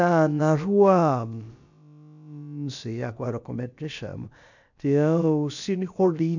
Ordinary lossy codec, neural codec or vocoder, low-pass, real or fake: none; codec, 16 kHz, about 1 kbps, DyCAST, with the encoder's durations; 7.2 kHz; fake